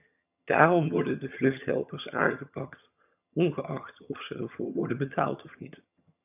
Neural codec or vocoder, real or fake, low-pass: vocoder, 22.05 kHz, 80 mel bands, HiFi-GAN; fake; 3.6 kHz